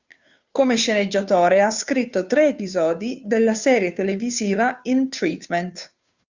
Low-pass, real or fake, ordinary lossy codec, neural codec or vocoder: 7.2 kHz; fake; Opus, 64 kbps; codec, 16 kHz, 2 kbps, FunCodec, trained on Chinese and English, 25 frames a second